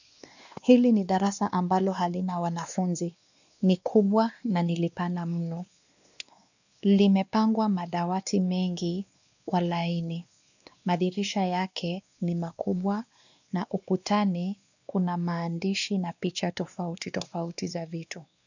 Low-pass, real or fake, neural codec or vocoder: 7.2 kHz; fake; codec, 16 kHz, 2 kbps, X-Codec, WavLM features, trained on Multilingual LibriSpeech